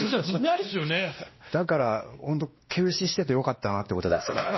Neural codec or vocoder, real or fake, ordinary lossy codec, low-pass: codec, 16 kHz, 1 kbps, X-Codec, WavLM features, trained on Multilingual LibriSpeech; fake; MP3, 24 kbps; 7.2 kHz